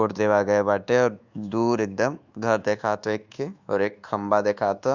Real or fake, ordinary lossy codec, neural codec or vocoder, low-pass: fake; Opus, 64 kbps; codec, 24 kHz, 1.2 kbps, DualCodec; 7.2 kHz